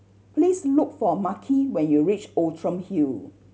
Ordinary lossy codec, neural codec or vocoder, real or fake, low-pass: none; none; real; none